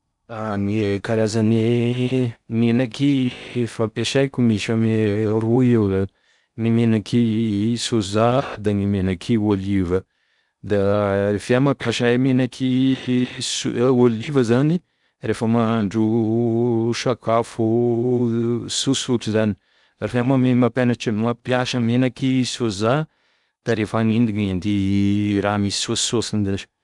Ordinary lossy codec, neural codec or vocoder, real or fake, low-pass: none; codec, 16 kHz in and 24 kHz out, 0.6 kbps, FocalCodec, streaming, 4096 codes; fake; 10.8 kHz